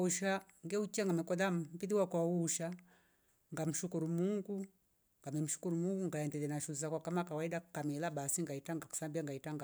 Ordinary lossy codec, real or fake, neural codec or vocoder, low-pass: none; real; none; none